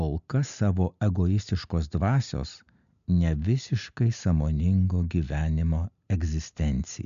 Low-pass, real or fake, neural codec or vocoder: 7.2 kHz; real; none